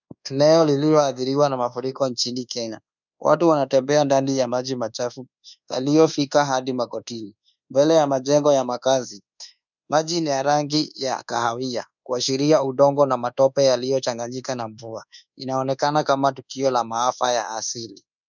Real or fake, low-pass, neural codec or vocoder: fake; 7.2 kHz; codec, 24 kHz, 1.2 kbps, DualCodec